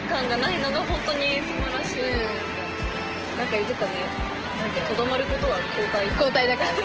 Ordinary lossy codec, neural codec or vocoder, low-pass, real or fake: Opus, 16 kbps; none; 7.2 kHz; real